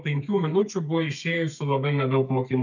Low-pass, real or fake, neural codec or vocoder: 7.2 kHz; fake; codec, 32 kHz, 1.9 kbps, SNAC